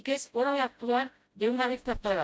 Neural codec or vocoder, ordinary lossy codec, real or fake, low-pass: codec, 16 kHz, 0.5 kbps, FreqCodec, smaller model; none; fake; none